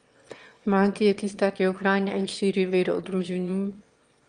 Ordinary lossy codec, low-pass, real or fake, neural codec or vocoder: Opus, 32 kbps; 9.9 kHz; fake; autoencoder, 22.05 kHz, a latent of 192 numbers a frame, VITS, trained on one speaker